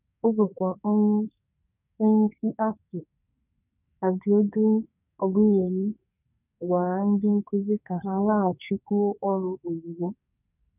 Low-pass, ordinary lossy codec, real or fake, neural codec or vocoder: 3.6 kHz; none; fake; codec, 44.1 kHz, 2.6 kbps, SNAC